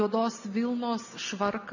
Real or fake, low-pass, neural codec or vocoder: real; 7.2 kHz; none